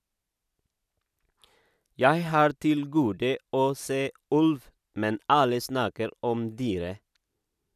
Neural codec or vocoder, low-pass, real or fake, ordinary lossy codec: none; 14.4 kHz; real; none